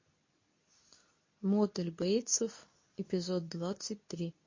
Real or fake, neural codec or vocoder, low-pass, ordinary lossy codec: fake; codec, 24 kHz, 0.9 kbps, WavTokenizer, medium speech release version 2; 7.2 kHz; MP3, 32 kbps